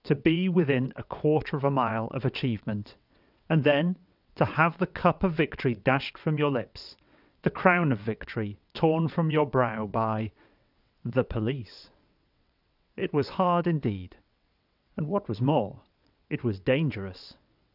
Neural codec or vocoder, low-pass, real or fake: vocoder, 22.05 kHz, 80 mel bands, WaveNeXt; 5.4 kHz; fake